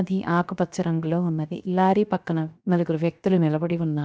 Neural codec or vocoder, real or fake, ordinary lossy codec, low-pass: codec, 16 kHz, 0.7 kbps, FocalCodec; fake; none; none